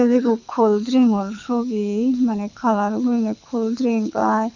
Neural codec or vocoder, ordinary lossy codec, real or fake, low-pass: codec, 24 kHz, 6 kbps, HILCodec; none; fake; 7.2 kHz